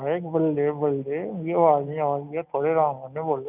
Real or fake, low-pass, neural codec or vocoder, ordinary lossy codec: real; 3.6 kHz; none; none